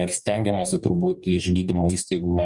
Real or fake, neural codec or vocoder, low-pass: fake; codec, 44.1 kHz, 2.6 kbps, DAC; 10.8 kHz